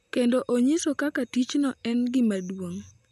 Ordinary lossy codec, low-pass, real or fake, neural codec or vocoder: none; 14.4 kHz; real; none